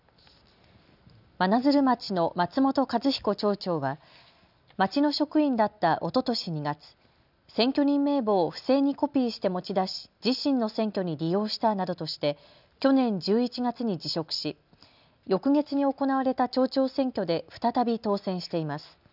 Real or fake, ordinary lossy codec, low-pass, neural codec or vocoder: real; none; 5.4 kHz; none